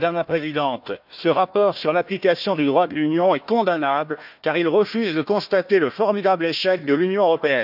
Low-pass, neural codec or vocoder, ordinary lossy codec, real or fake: 5.4 kHz; codec, 16 kHz, 1 kbps, FunCodec, trained on Chinese and English, 50 frames a second; none; fake